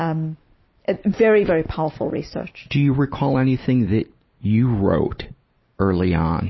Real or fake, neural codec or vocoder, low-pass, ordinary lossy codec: real; none; 7.2 kHz; MP3, 24 kbps